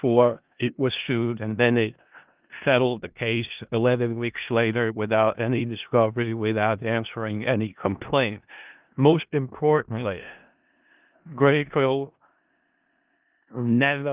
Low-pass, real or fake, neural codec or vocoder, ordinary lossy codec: 3.6 kHz; fake; codec, 16 kHz in and 24 kHz out, 0.4 kbps, LongCat-Audio-Codec, four codebook decoder; Opus, 32 kbps